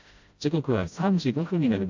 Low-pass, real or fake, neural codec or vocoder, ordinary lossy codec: 7.2 kHz; fake; codec, 16 kHz, 0.5 kbps, FreqCodec, smaller model; MP3, 64 kbps